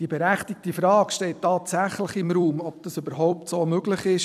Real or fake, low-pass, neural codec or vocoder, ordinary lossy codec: fake; 14.4 kHz; vocoder, 44.1 kHz, 128 mel bands every 256 samples, BigVGAN v2; none